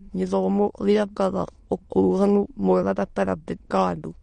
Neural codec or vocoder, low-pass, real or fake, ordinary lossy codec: autoencoder, 22.05 kHz, a latent of 192 numbers a frame, VITS, trained on many speakers; 9.9 kHz; fake; MP3, 48 kbps